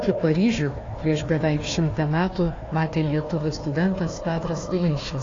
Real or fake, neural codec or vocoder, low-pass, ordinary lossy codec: fake; codec, 16 kHz, 1 kbps, FunCodec, trained on Chinese and English, 50 frames a second; 7.2 kHz; AAC, 32 kbps